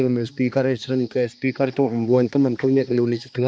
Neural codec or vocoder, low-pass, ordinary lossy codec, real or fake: codec, 16 kHz, 2 kbps, X-Codec, HuBERT features, trained on balanced general audio; none; none; fake